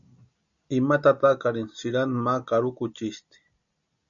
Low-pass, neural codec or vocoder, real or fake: 7.2 kHz; none; real